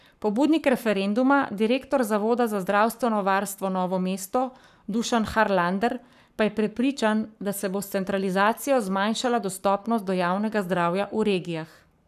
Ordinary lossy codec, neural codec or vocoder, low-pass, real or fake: none; codec, 44.1 kHz, 7.8 kbps, Pupu-Codec; 14.4 kHz; fake